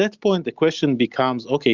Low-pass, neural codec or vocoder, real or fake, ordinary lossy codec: 7.2 kHz; none; real; Opus, 64 kbps